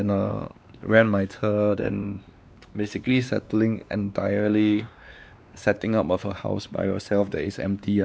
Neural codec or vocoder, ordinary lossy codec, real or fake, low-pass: codec, 16 kHz, 2 kbps, X-Codec, WavLM features, trained on Multilingual LibriSpeech; none; fake; none